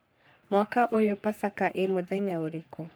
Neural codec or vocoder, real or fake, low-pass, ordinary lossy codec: codec, 44.1 kHz, 3.4 kbps, Pupu-Codec; fake; none; none